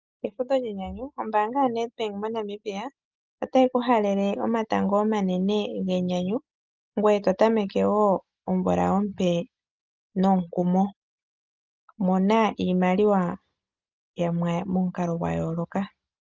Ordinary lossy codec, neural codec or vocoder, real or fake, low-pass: Opus, 24 kbps; none; real; 7.2 kHz